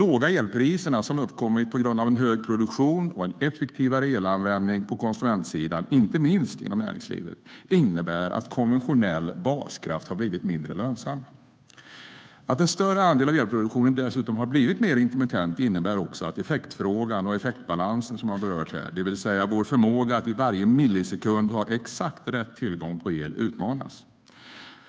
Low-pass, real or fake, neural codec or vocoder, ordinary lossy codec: none; fake; codec, 16 kHz, 2 kbps, FunCodec, trained on Chinese and English, 25 frames a second; none